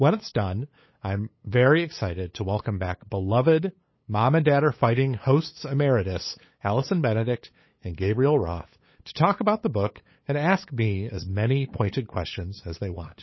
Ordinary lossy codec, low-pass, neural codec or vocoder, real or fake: MP3, 24 kbps; 7.2 kHz; codec, 16 kHz, 8 kbps, FunCodec, trained on LibriTTS, 25 frames a second; fake